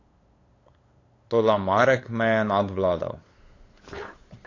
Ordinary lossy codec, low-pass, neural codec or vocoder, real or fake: AAC, 32 kbps; 7.2 kHz; codec, 16 kHz, 8 kbps, FunCodec, trained on LibriTTS, 25 frames a second; fake